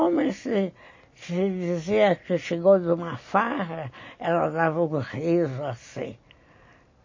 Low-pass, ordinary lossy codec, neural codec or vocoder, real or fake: 7.2 kHz; MP3, 32 kbps; none; real